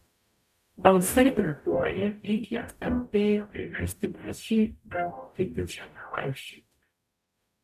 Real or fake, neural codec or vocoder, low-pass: fake; codec, 44.1 kHz, 0.9 kbps, DAC; 14.4 kHz